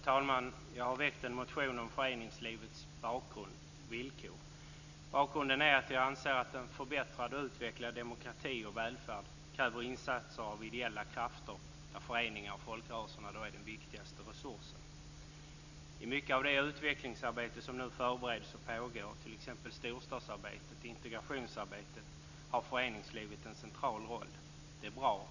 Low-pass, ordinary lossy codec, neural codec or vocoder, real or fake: 7.2 kHz; none; none; real